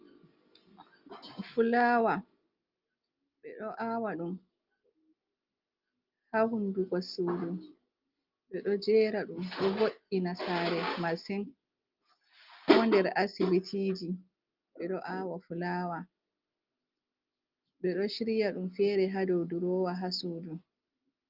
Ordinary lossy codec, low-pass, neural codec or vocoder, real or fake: Opus, 32 kbps; 5.4 kHz; none; real